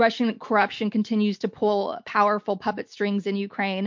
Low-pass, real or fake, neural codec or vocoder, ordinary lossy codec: 7.2 kHz; real; none; MP3, 48 kbps